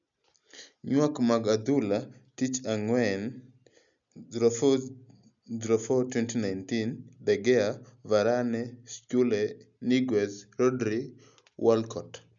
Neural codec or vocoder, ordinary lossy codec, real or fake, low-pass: none; none; real; 7.2 kHz